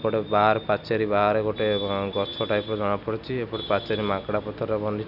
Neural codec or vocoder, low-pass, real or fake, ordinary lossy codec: none; 5.4 kHz; real; none